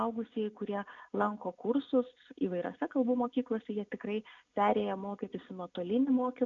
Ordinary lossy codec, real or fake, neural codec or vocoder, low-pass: AAC, 48 kbps; real; none; 7.2 kHz